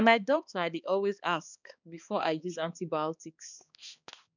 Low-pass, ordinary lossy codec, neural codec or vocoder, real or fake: 7.2 kHz; none; codec, 16 kHz, 2 kbps, X-Codec, HuBERT features, trained on balanced general audio; fake